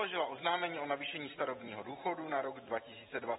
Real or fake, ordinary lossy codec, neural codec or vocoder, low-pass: fake; AAC, 16 kbps; vocoder, 44.1 kHz, 128 mel bands every 256 samples, BigVGAN v2; 19.8 kHz